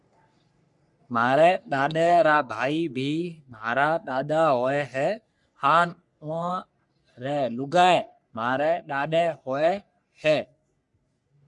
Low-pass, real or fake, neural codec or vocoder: 10.8 kHz; fake; codec, 44.1 kHz, 3.4 kbps, Pupu-Codec